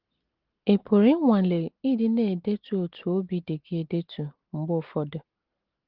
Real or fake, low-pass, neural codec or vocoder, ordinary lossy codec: real; 5.4 kHz; none; Opus, 16 kbps